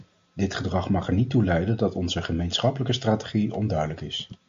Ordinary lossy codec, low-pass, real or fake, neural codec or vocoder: MP3, 48 kbps; 7.2 kHz; real; none